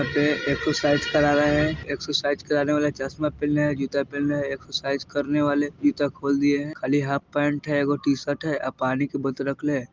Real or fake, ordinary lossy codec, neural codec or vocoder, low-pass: real; Opus, 32 kbps; none; 7.2 kHz